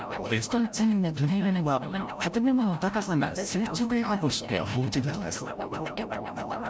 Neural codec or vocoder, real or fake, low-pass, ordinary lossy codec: codec, 16 kHz, 0.5 kbps, FreqCodec, larger model; fake; none; none